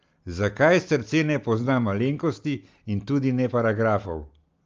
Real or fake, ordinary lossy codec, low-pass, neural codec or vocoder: real; Opus, 24 kbps; 7.2 kHz; none